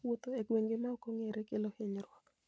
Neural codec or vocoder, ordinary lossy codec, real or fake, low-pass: none; none; real; none